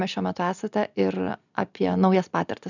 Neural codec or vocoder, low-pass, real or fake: none; 7.2 kHz; real